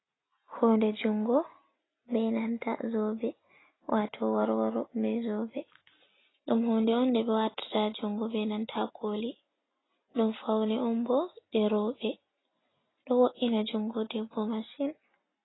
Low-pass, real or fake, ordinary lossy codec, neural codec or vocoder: 7.2 kHz; real; AAC, 16 kbps; none